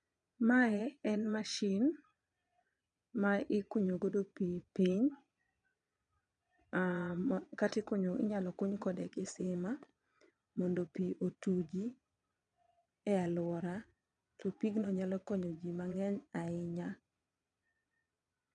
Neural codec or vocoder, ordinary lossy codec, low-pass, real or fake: vocoder, 22.05 kHz, 80 mel bands, Vocos; none; 9.9 kHz; fake